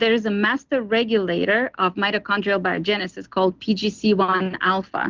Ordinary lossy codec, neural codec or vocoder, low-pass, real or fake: Opus, 16 kbps; none; 7.2 kHz; real